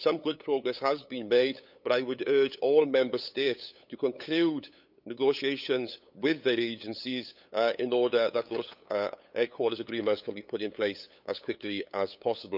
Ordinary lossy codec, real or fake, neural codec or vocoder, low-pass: none; fake; codec, 16 kHz, 8 kbps, FunCodec, trained on LibriTTS, 25 frames a second; 5.4 kHz